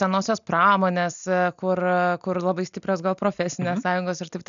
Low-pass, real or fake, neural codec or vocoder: 7.2 kHz; real; none